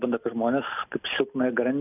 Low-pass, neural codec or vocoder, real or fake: 3.6 kHz; none; real